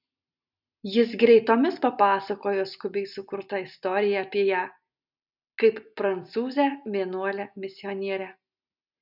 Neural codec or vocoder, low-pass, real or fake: none; 5.4 kHz; real